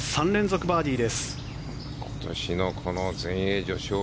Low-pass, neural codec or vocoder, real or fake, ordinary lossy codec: none; none; real; none